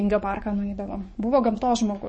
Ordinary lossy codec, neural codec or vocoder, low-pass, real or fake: MP3, 32 kbps; codec, 44.1 kHz, 7.8 kbps, DAC; 9.9 kHz; fake